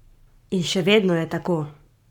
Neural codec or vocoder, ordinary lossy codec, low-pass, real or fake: codec, 44.1 kHz, 7.8 kbps, Pupu-Codec; none; 19.8 kHz; fake